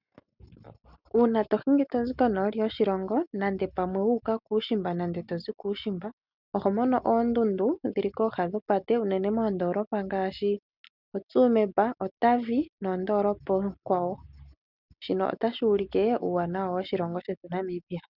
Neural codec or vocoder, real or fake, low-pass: none; real; 5.4 kHz